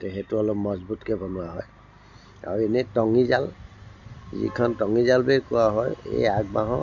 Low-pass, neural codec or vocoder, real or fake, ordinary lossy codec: 7.2 kHz; none; real; none